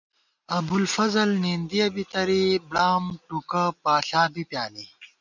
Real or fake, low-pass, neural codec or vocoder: real; 7.2 kHz; none